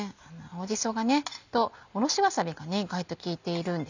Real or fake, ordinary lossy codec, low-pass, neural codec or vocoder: real; none; 7.2 kHz; none